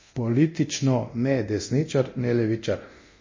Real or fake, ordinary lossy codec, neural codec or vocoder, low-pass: fake; MP3, 32 kbps; codec, 24 kHz, 0.9 kbps, DualCodec; 7.2 kHz